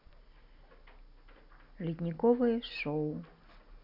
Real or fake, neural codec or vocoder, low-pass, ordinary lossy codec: real; none; 5.4 kHz; MP3, 48 kbps